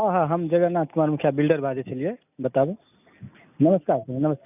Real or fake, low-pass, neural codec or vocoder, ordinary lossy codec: real; 3.6 kHz; none; none